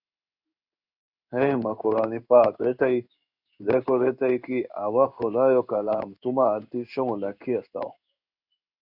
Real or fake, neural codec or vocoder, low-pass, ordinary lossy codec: fake; codec, 16 kHz in and 24 kHz out, 1 kbps, XY-Tokenizer; 5.4 kHz; Opus, 64 kbps